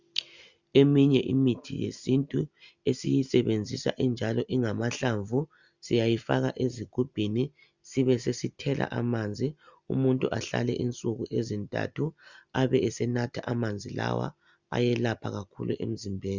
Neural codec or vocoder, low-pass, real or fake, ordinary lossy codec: none; 7.2 kHz; real; Opus, 64 kbps